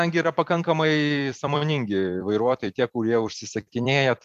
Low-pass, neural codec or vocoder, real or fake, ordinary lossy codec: 14.4 kHz; vocoder, 44.1 kHz, 128 mel bands every 256 samples, BigVGAN v2; fake; MP3, 96 kbps